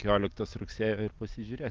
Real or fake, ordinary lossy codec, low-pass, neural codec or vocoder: real; Opus, 32 kbps; 7.2 kHz; none